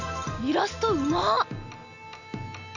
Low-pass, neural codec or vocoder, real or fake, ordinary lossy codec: 7.2 kHz; none; real; none